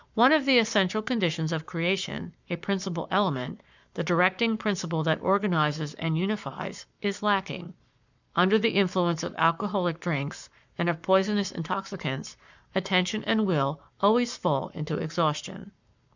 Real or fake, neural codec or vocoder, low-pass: fake; codec, 44.1 kHz, 7.8 kbps, Pupu-Codec; 7.2 kHz